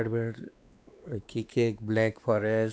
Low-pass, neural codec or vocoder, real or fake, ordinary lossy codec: none; codec, 16 kHz, 2 kbps, X-Codec, WavLM features, trained on Multilingual LibriSpeech; fake; none